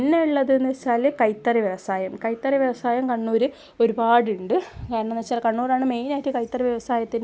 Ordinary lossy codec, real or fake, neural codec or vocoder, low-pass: none; real; none; none